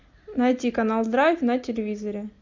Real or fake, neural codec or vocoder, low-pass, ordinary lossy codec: real; none; 7.2 kHz; MP3, 64 kbps